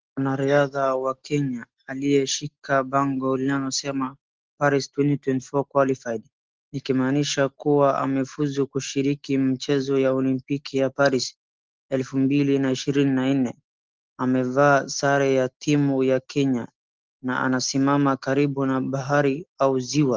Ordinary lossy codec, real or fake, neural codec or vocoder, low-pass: Opus, 16 kbps; real; none; 7.2 kHz